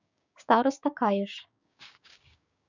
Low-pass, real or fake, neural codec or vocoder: 7.2 kHz; fake; codec, 16 kHz, 6 kbps, DAC